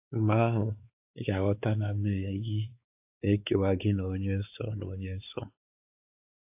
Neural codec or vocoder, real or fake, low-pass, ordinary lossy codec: codec, 16 kHz, 4 kbps, X-Codec, WavLM features, trained on Multilingual LibriSpeech; fake; 3.6 kHz; AAC, 32 kbps